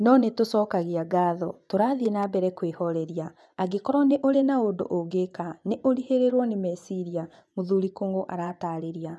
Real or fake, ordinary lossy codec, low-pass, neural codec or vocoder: fake; none; none; vocoder, 24 kHz, 100 mel bands, Vocos